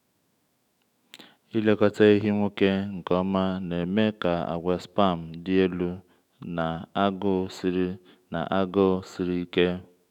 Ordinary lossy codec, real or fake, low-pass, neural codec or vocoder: none; fake; 19.8 kHz; autoencoder, 48 kHz, 128 numbers a frame, DAC-VAE, trained on Japanese speech